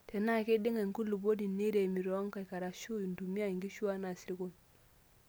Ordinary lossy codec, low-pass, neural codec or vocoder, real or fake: none; none; none; real